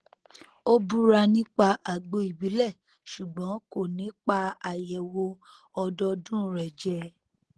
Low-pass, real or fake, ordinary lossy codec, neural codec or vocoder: 10.8 kHz; real; Opus, 16 kbps; none